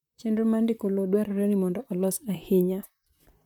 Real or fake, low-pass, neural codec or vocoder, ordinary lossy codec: fake; 19.8 kHz; vocoder, 44.1 kHz, 128 mel bands, Pupu-Vocoder; none